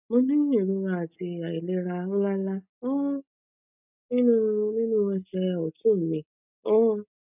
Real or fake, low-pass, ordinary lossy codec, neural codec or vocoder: real; 3.6 kHz; none; none